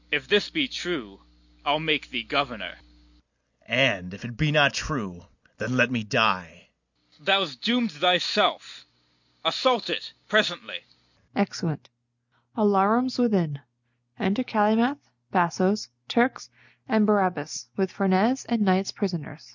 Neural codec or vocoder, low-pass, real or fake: none; 7.2 kHz; real